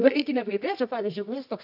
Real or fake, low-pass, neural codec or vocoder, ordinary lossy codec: fake; 5.4 kHz; codec, 24 kHz, 0.9 kbps, WavTokenizer, medium music audio release; AAC, 48 kbps